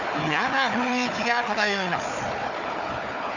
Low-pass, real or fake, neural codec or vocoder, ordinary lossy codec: 7.2 kHz; fake; codec, 16 kHz, 4 kbps, FunCodec, trained on Chinese and English, 50 frames a second; none